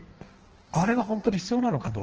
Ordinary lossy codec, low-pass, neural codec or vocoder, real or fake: Opus, 16 kbps; 7.2 kHz; codec, 16 kHz in and 24 kHz out, 1.1 kbps, FireRedTTS-2 codec; fake